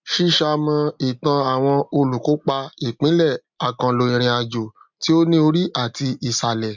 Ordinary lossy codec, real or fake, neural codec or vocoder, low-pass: MP3, 64 kbps; real; none; 7.2 kHz